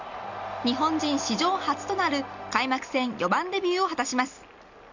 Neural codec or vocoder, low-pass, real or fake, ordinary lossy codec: none; 7.2 kHz; real; none